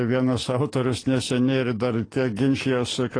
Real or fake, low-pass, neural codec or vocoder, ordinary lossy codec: fake; 9.9 kHz; codec, 44.1 kHz, 7.8 kbps, DAC; AAC, 32 kbps